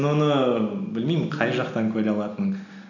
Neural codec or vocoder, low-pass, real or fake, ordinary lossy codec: none; 7.2 kHz; real; none